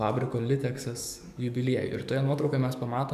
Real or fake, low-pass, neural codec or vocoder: fake; 14.4 kHz; codec, 44.1 kHz, 7.8 kbps, DAC